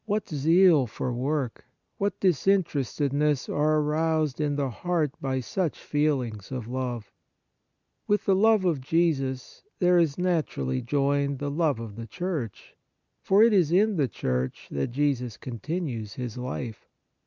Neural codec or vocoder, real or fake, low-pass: none; real; 7.2 kHz